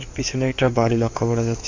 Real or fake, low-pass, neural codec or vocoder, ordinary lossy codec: fake; 7.2 kHz; codec, 16 kHz in and 24 kHz out, 2.2 kbps, FireRedTTS-2 codec; AAC, 48 kbps